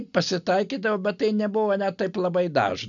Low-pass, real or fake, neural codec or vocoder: 7.2 kHz; real; none